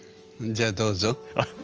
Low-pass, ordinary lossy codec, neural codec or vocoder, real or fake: 7.2 kHz; Opus, 24 kbps; none; real